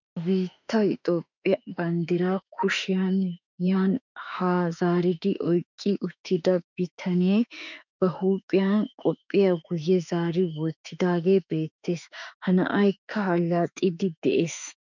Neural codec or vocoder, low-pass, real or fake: autoencoder, 48 kHz, 32 numbers a frame, DAC-VAE, trained on Japanese speech; 7.2 kHz; fake